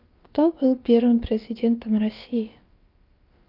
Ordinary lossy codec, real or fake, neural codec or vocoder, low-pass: Opus, 24 kbps; fake; codec, 16 kHz, about 1 kbps, DyCAST, with the encoder's durations; 5.4 kHz